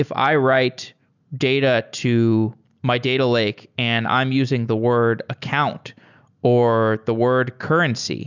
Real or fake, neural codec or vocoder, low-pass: real; none; 7.2 kHz